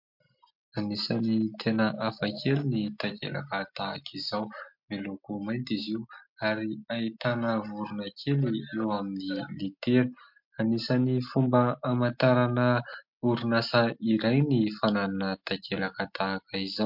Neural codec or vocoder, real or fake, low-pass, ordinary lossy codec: none; real; 5.4 kHz; MP3, 48 kbps